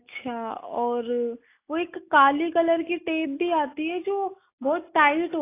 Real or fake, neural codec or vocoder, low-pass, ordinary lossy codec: real; none; 3.6 kHz; AAC, 24 kbps